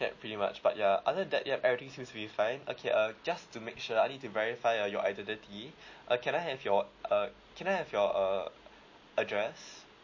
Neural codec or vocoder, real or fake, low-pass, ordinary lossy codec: none; real; 7.2 kHz; MP3, 32 kbps